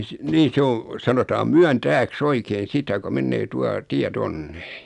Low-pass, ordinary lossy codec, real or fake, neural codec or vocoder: 10.8 kHz; none; real; none